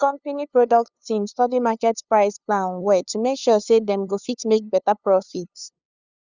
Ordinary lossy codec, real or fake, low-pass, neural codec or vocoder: Opus, 64 kbps; fake; 7.2 kHz; codec, 16 kHz, 2 kbps, FunCodec, trained on LibriTTS, 25 frames a second